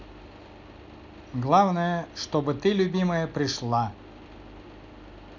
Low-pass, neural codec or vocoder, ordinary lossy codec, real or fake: 7.2 kHz; none; none; real